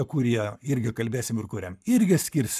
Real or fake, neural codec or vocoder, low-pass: fake; codec, 44.1 kHz, 7.8 kbps, Pupu-Codec; 14.4 kHz